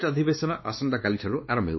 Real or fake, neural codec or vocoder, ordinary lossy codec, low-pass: fake; codec, 16 kHz, 2 kbps, X-Codec, WavLM features, trained on Multilingual LibriSpeech; MP3, 24 kbps; 7.2 kHz